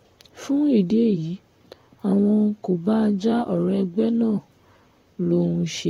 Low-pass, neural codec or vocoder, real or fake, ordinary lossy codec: 19.8 kHz; vocoder, 44.1 kHz, 128 mel bands, Pupu-Vocoder; fake; AAC, 48 kbps